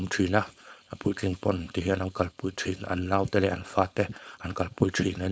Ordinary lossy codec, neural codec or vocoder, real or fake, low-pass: none; codec, 16 kHz, 4.8 kbps, FACodec; fake; none